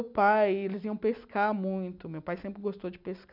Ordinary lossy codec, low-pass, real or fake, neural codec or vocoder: none; 5.4 kHz; real; none